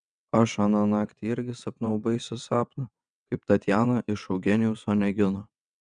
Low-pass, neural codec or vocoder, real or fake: 9.9 kHz; vocoder, 22.05 kHz, 80 mel bands, WaveNeXt; fake